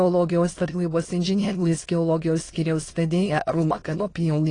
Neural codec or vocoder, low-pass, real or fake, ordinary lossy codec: autoencoder, 22.05 kHz, a latent of 192 numbers a frame, VITS, trained on many speakers; 9.9 kHz; fake; AAC, 32 kbps